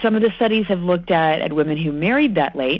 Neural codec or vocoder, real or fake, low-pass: none; real; 7.2 kHz